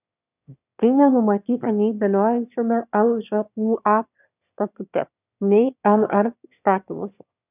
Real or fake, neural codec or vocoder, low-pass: fake; autoencoder, 22.05 kHz, a latent of 192 numbers a frame, VITS, trained on one speaker; 3.6 kHz